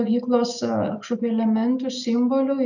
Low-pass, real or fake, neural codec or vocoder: 7.2 kHz; real; none